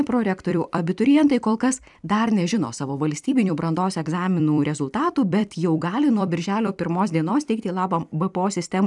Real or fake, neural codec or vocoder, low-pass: fake; vocoder, 44.1 kHz, 128 mel bands every 256 samples, BigVGAN v2; 10.8 kHz